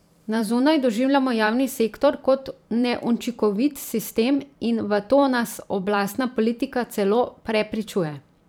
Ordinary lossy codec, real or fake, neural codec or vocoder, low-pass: none; fake; vocoder, 44.1 kHz, 128 mel bands every 512 samples, BigVGAN v2; none